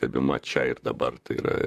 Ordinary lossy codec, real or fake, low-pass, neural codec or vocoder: MP3, 96 kbps; fake; 14.4 kHz; vocoder, 44.1 kHz, 128 mel bands, Pupu-Vocoder